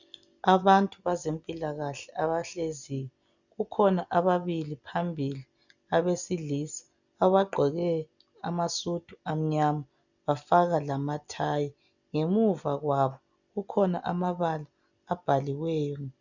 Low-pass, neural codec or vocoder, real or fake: 7.2 kHz; none; real